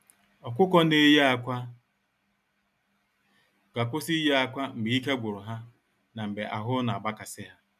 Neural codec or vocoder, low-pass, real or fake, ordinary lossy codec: none; 14.4 kHz; real; none